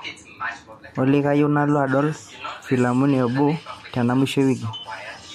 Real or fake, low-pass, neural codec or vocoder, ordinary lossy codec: real; 14.4 kHz; none; MP3, 64 kbps